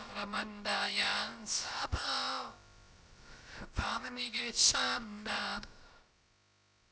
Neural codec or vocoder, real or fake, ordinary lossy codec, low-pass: codec, 16 kHz, about 1 kbps, DyCAST, with the encoder's durations; fake; none; none